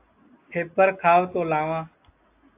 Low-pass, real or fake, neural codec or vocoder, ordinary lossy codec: 3.6 kHz; real; none; AAC, 24 kbps